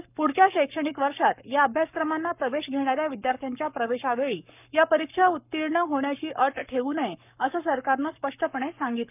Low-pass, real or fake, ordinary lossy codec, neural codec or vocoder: 3.6 kHz; fake; none; codec, 44.1 kHz, 7.8 kbps, Pupu-Codec